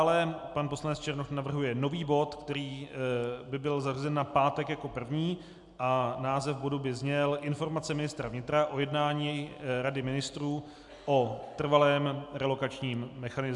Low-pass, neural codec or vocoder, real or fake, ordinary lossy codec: 10.8 kHz; none; real; MP3, 96 kbps